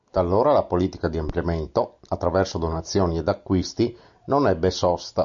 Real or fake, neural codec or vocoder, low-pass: real; none; 7.2 kHz